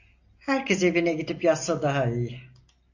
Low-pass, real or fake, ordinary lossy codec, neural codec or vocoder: 7.2 kHz; real; AAC, 48 kbps; none